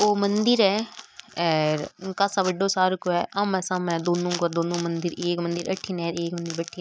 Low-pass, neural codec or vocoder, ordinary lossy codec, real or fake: none; none; none; real